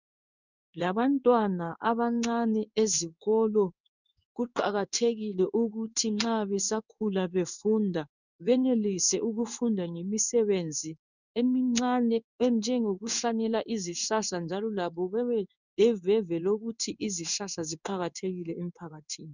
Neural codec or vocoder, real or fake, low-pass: codec, 16 kHz in and 24 kHz out, 1 kbps, XY-Tokenizer; fake; 7.2 kHz